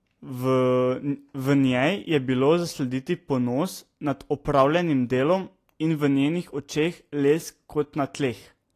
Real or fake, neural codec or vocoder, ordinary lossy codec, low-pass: real; none; AAC, 48 kbps; 14.4 kHz